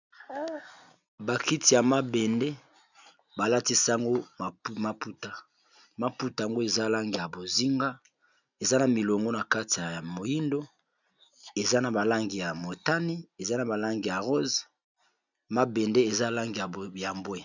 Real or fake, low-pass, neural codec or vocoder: real; 7.2 kHz; none